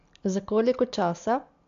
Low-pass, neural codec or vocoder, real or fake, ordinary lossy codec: 7.2 kHz; none; real; MP3, 64 kbps